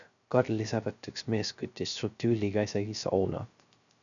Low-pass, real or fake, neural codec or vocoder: 7.2 kHz; fake; codec, 16 kHz, 0.3 kbps, FocalCodec